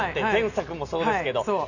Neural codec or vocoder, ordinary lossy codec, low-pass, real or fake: none; none; 7.2 kHz; real